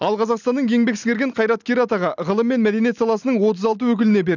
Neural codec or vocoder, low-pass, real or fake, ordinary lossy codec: none; 7.2 kHz; real; none